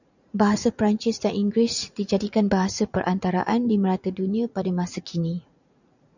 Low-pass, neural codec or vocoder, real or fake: 7.2 kHz; vocoder, 44.1 kHz, 80 mel bands, Vocos; fake